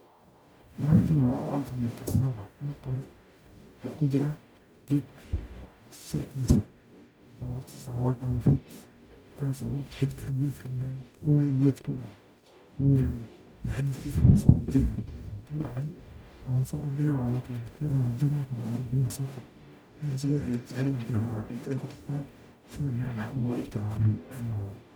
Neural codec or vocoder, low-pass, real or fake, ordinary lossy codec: codec, 44.1 kHz, 0.9 kbps, DAC; none; fake; none